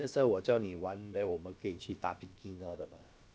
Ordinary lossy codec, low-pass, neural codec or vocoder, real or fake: none; none; codec, 16 kHz, about 1 kbps, DyCAST, with the encoder's durations; fake